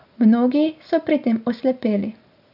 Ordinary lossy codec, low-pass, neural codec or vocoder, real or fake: none; 5.4 kHz; none; real